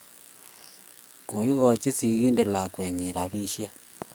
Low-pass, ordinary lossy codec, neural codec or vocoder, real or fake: none; none; codec, 44.1 kHz, 2.6 kbps, SNAC; fake